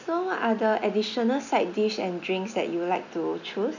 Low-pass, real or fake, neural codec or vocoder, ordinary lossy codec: 7.2 kHz; real; none; none